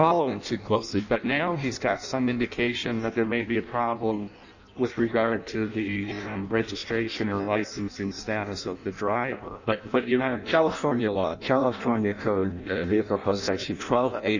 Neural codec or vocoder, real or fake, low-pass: codec, 16 kHz in and 24 kHz out, 0.6 kbps, FireRedTTS-2 codec; fake; 7.2 kHz